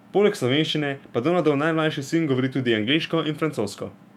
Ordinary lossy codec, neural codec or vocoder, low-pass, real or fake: MP3, 96 kbps; autoencoder, 48 kHz, 128 numbers a frame, DAC-VAE, trained on Japanese speech; 19.8 kHz; fake